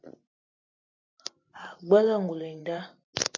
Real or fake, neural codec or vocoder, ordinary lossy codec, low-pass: fake; vocoder, 44.1 kHz, 128 mel bands every 512 samples, BigVGAN v2; MP3, 48 kbps; 7.2 kHz